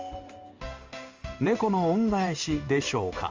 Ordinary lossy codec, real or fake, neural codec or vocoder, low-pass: Opus, 32 kbps; fake; codec, 16 kHz in and 24 kHz out, 1 kbps, XY-Tokenizer; 7.2 kHz